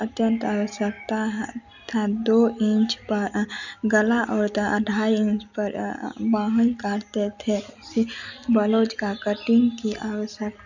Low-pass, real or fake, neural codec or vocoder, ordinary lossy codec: 7.2 kHz; real; none; none